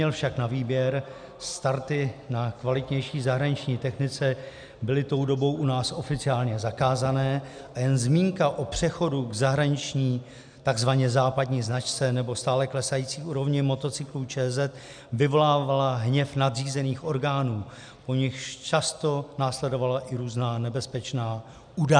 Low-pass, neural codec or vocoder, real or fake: 9.9 kHz; none; real